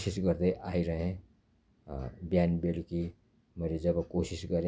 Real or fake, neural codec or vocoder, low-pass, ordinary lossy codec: real; none; none; none